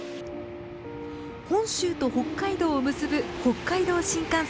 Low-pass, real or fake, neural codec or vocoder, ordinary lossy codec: none; real; none; none